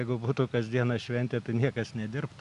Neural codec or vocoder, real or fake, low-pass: none; real; 10.8 kHz